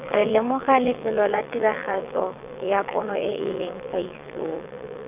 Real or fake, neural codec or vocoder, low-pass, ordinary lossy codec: fake; vocoder, 22.05 kHz, 80 mel bands, WaveNeXt; 3.6 kHz; none